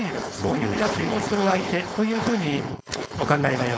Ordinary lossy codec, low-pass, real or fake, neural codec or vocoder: none; none; fake; codec, 16 kHz, 4.8 kbps, FACodec